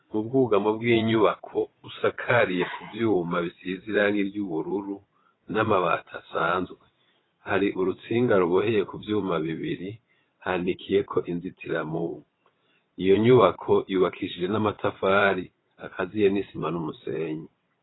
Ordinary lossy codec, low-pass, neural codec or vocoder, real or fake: AAC, 16 kbps; 7.2 kHz; vocoder, 22.05 kHz, 80 mel bands, WaveNeXt; fake